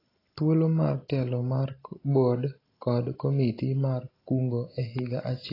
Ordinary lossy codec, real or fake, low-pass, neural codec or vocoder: AAC, 24 kbps; real; 5.4 kHz; none